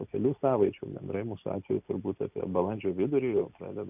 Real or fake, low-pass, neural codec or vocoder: real; 3.6 kHz; none